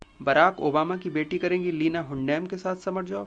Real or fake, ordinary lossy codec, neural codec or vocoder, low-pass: real; Opus, 64 kbps; none; 9.9 kHz